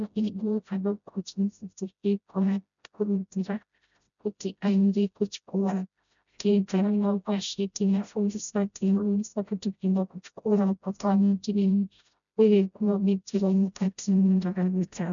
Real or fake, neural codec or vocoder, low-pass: fake; codec, 16 kHz, 0.5 kbps, FreqCodec, smaller model; 7.2 kHz